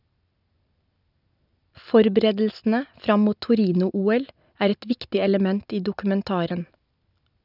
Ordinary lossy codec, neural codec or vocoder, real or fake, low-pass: none; none; real; 5.4 kHz